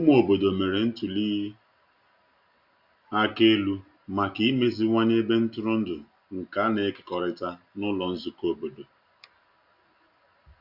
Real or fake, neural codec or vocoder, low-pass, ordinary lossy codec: real; none; 5.4 kHz; none